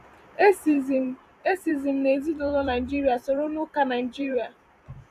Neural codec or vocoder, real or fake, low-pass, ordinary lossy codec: vocoder, 44.1 kHz, 128 mel bands every 256 samples, BigVGAN v2; fake; 14.4 kHz; none